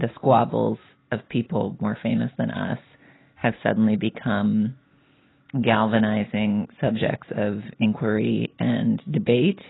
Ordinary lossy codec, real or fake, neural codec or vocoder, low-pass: AAC, 16 kbps; real; none; 7.2 kHz